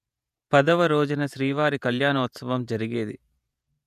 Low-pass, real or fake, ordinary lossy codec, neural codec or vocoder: 14.4 kHz; fake; none; vocoder, 48 kHz, 128 mel bands, Vocos